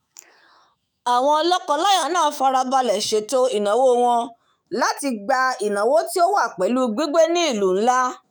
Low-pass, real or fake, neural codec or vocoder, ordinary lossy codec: none; fake; autoencoder, 48 kHz, 128 numbers a frame, DAC-VAE, trained on Japanese speech; none